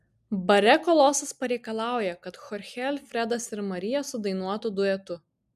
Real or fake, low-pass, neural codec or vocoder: real; 14.4 kHz; none